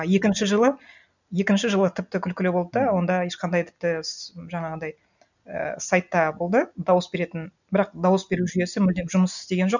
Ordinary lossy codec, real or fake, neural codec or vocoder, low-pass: none; real; none; 7.2 kHz